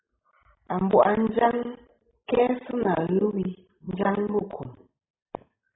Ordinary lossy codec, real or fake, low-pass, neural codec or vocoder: AAC, 16 kbps; real; 7.2 kHz; none